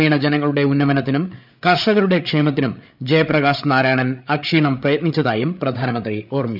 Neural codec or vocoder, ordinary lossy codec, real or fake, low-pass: codec, 16 kHz, 8 kbps, FreqCodec, larger model; none; fake; 5.4 kHz